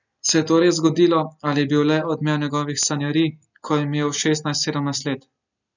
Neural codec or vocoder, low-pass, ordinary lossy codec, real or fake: none; 7.2 kHz; none; real